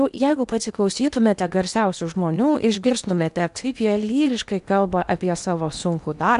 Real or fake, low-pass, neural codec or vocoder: fake; 10.8 kHz; codec, 16 kHz in and 24 kHz out, 0.8 kbps, FocalCodec, streaming, 65536 codes